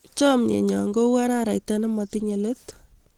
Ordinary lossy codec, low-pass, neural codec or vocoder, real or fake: Opus, 16 kbps; 19.8 kHz; none; real